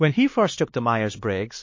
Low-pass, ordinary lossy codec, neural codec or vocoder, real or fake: 7.2 kHz; MP3, 32 kbps; codec, 16 kHz, 4 kbps, X-Codec, HuBERT features, trained on LibriSpeech; fake